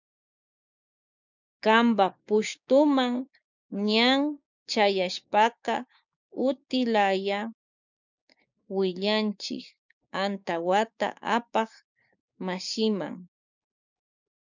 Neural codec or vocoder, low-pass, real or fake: codec, 16 kHz, 6 kbps, DAC; 7.2 kHz; fake